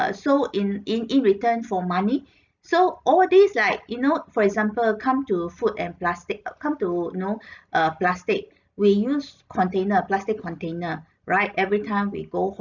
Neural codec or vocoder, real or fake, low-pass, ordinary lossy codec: none; real; 7.2 kHz; none